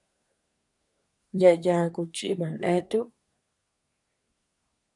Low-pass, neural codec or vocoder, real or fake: 10.8 kHz; codec, 24 kHz, 1 kbps, SNAC; fake